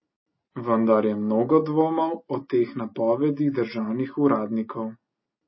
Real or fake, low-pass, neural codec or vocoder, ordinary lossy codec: real; 7.2 kHz; none; MP3, 24 kbps